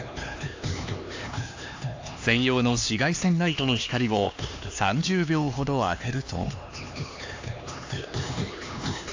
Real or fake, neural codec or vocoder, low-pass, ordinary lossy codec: fake; codec, 16 kHz, 2 kbps, X-Codec, HuBERT features, trained on LibriSpeech; 7.2 kHz; AAC, 48 kbps